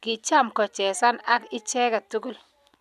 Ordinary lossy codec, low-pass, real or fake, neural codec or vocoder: none; 14.4 kHz; real; none